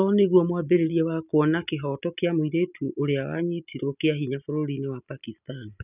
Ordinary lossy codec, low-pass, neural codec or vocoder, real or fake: none; 3.6 kHz; none; real